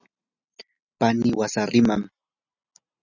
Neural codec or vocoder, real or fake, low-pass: none; real; 7.2 kHz